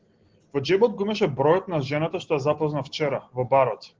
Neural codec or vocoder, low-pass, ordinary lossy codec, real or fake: none; 7.2 kHz; Opus, 16 kbps; real